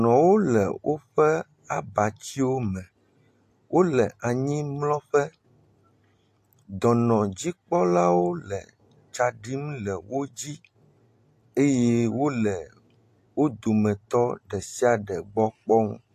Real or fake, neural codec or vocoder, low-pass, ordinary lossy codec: real; none; 14.4 kHz; AAC, 64 kbps